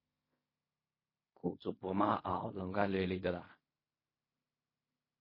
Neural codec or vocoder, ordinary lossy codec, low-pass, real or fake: codec, 16 kHz in and 24 kHz out, 0.4 kbps, LongCat-Audio-Codec, fine tuned four codebook decoder; MP3, 24 kbps; 5.4 kHz; fake